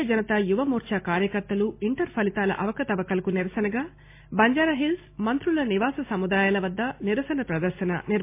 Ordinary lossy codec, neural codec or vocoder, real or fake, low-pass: MP3, 24 kbps; none; real; 3.6 kHz